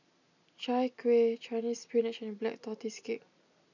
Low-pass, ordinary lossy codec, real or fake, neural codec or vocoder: 7.2 kHz; none; real; none